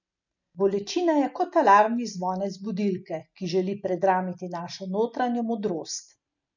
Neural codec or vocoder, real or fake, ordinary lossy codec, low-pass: none; real; none; 7.2 kHz